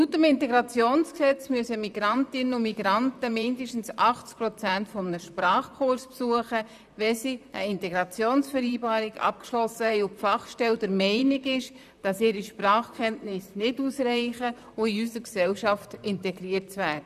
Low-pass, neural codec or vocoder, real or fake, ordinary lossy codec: 14.4 kHz; vocoder, 44.1 kHz, 128 mel bands, Pupu-Vocoder; fake; none